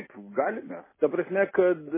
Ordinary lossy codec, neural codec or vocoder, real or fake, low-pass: MP3, 16 kbps; none; real; 3.6 kHz